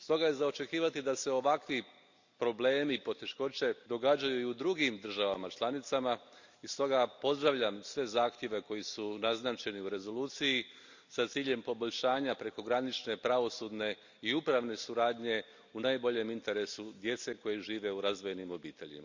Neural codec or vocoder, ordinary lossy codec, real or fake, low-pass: none; Opus, 64 kbps; real; 7.2 kHz